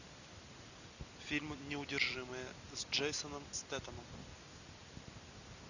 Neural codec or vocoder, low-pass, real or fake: none; 7.2 kHz; real